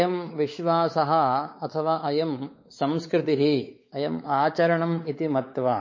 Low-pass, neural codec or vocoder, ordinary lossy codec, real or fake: 7.2 kHz; codec, 16 kHz, 4 kbps, X-Codec, WavLM features, trained on Multilingual LibriSpeech; MP3, 32 kbps; fake